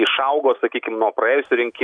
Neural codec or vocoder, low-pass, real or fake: none; 9.9 kHz; real